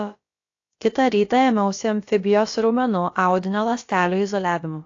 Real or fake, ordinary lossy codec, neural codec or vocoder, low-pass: fake; AAC, 48 kbps; codec, 16 kHz, about 1 kbps, DyCAST, with the encoder's durations; 7.2 kHz